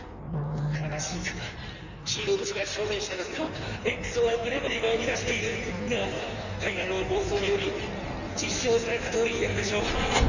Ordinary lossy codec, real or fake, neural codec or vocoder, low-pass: none; fake; codec, 16 kHz in and 24 kHz out, 1.1 kbps, FireRedTTS-2 codec; 7.2 kHz